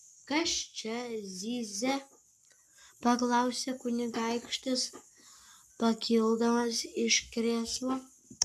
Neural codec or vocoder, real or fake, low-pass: codec, 44.1 kHz, 7.8 kbps, Pupu-Codec; fake; 14.4 kHz